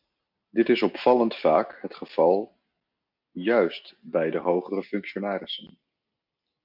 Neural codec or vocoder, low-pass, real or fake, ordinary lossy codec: none; 5.4 kHz; real; MP3, 48 kbps